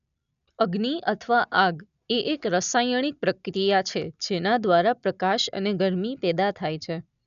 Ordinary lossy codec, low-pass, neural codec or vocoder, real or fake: none; 7.2 kHz; none; real